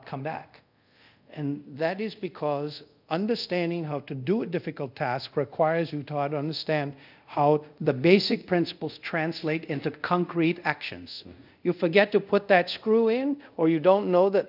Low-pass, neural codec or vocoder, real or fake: 5.4 kHz; codec, 24 kHz, 0.5 kbps, DualCodec; fake